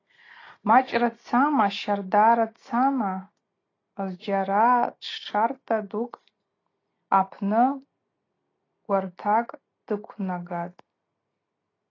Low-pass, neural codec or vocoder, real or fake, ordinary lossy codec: 7.2 kHz; none; real; AAC, 32 kbps